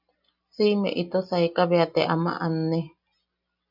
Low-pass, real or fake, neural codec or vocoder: 5.4 kHz; real; none